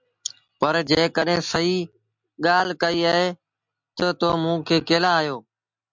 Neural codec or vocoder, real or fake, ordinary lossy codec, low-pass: none; real; MP3, 64 kbps; 7.2 kHz